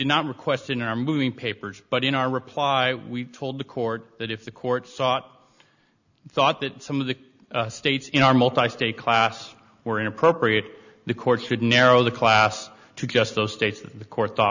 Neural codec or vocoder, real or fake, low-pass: none; real; 7.2 kHz